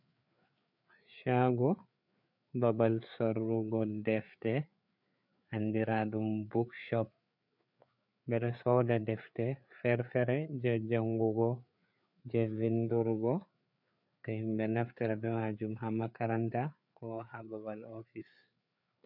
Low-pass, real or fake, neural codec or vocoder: 5.4 kHz; fake; codec, 16 kHz, 4 kbps, FreqCodec, larger model